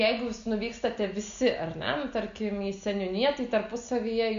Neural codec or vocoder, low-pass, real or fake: none; 7.2 kHz; real